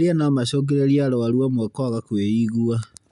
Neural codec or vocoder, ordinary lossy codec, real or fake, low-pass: none; none; real; 10.8 kHz